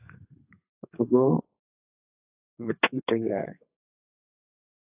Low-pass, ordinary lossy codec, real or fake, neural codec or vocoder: 3.6 kHz; AAC, 24 kbps; fake; codec, 32 kHz, 1.9 kbps, SNAC